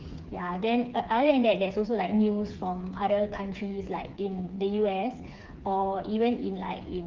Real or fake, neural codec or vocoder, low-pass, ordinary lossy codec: fake; codec, 16 kHz, 4 kbps, FreqCodec, smaller model; 7.2 kHz; Opus, 24 kbps